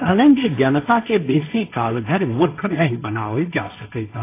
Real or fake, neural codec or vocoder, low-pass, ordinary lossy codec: fake; codec, 16 kHz, 1.1 kbps, Voila-Tokenizer; 3.6 kHz; AAC, 24 kbps